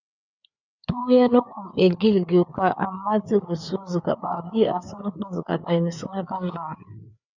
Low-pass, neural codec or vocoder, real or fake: 7.2 kHz; codec, 16 kHz, 4 kbps, FreqCodec, larger model; fake